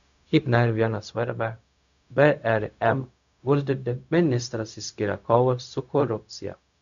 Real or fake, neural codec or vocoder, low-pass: fake; codec, 16 kHz, 0.4 kbps, LongCat-Audio-Codec; 7.2 kHz